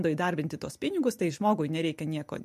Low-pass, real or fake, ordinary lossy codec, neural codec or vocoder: 14.4 kHz; real; MP3, 64 kbps; none